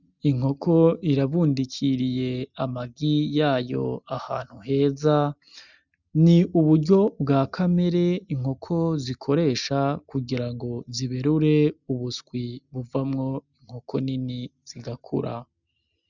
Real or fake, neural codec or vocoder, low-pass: real; none; 7.2 kHz